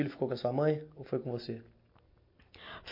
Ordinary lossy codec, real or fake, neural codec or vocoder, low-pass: MP3, 32 kbps; real; none; 5.4 kHz